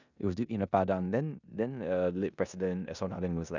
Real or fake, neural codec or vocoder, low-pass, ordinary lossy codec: fake; codec, 16 kHz in and 24 kHz out, 0.9 kbps, LongCat-Audio-Codec, four codebook decoder; 7.2 kHz; none